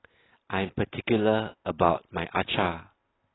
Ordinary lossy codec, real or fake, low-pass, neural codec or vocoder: AAC, 16 kbps; real; 7.2 kHz; none